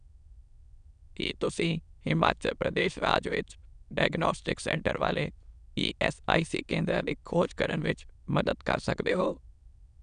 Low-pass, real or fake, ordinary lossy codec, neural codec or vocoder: 9.9 kHz; fake; none; autoencoder, 22.05 kHz, a latent of 192 numbers a frame, VITS, trained on many speakers